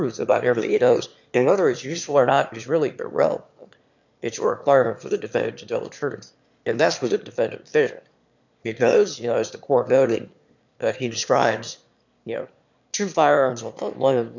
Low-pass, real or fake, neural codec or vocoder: 7.2 kHz; fake; autoencoder, 22.05 kHz, a latent of 192 numbers a frame, VITS, trained on one speaker